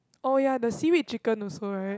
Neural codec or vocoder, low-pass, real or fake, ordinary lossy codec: none; none; real; none